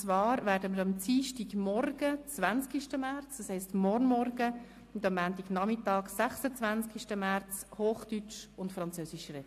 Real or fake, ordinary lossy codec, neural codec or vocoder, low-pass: real; AAC, 64 kbps; none; 14.4 kHz